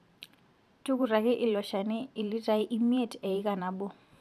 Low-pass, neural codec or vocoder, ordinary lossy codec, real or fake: 14.4 kHz; vocoder, 44.1 kHz, 128 mel bands every 512 samples, BigVGAN v2; none; fake